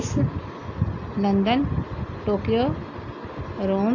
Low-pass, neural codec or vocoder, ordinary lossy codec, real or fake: 7.2 kHz; none; AAC, 48 kbps; real